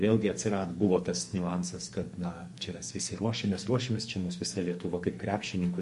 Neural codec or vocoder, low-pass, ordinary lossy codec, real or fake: codec, 44.1 kHz, 2.6 kbps, SNAC; 14.4 kHz; MP3, 48 kbps; fake